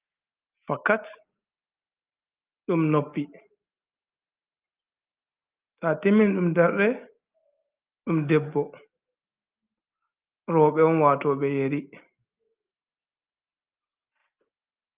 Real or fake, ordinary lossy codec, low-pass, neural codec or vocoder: real; Opus, 32 kbps; 3.6 kHz; none